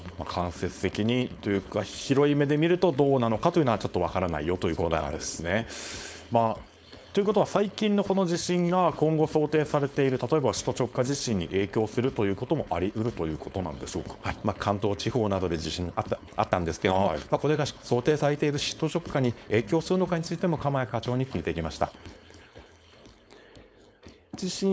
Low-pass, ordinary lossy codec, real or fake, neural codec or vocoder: none; none; fake; codec, 16 kHz, 4.8 kbps, FACodec